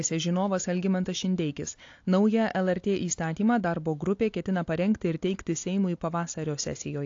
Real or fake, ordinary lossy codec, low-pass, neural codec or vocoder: real; AAC, 48 kbps; 7.2 kHz; none